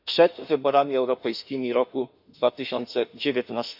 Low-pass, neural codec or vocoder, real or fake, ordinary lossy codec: 5.4 kHz; autoencoder, 48 kHz, 32 numbers a frame, DAC-VAE, trained on Japanese speech; fake; none